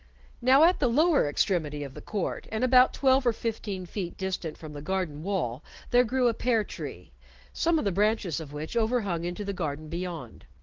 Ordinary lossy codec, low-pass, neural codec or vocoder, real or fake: Opus, 16 kbps; 7.2 kHz; none; real